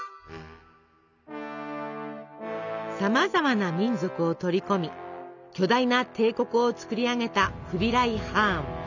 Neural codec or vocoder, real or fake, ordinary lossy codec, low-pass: none; real; none; 7.2 kHz